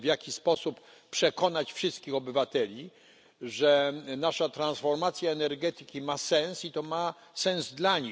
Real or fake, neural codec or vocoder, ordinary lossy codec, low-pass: real; none; none; none